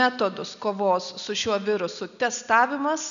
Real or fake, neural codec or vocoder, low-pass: real; none; 7.2 kHz